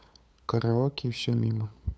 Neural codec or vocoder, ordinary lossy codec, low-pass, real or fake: codec, 16 kHz, 8 kbps, FunCodec, trained on LibriTTS, 25 frames a second; none; none; fake